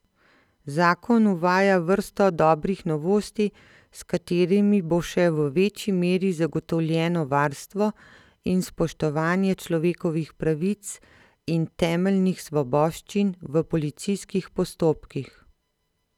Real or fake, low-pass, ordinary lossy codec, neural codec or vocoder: real; 19.8 kHz; none; none